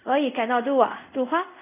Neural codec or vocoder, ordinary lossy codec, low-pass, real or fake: codec, 24 kHz, 0.5 kbps, DualCodec; none; 3.6 kHz; fake